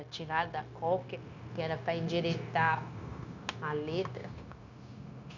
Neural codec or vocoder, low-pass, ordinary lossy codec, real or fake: codec, 16 kHz, 0.9 kbps, LongCat-Audio-Codec; 7.2 kHz; none; fake